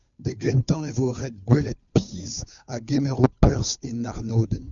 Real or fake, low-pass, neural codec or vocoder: fake; 7.2 kHz; codec, 16 kHz, 2 kbps, FunCodec, trained on Chinese and English, 25 frames a second